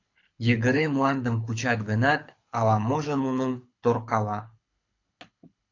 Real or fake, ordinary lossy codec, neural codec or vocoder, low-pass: fake; AAC, 48 kbps; codec, 24 kHz, 6 kbps, HILCodec; 7.2 kHz